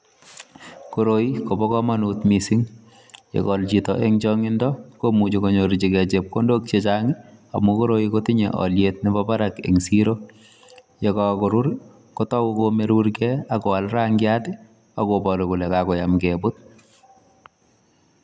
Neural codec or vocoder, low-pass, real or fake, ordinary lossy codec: none; none; real; none